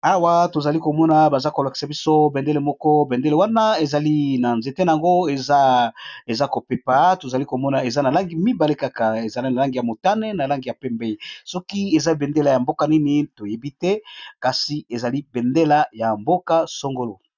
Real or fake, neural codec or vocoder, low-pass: real; none; 7.2 kHz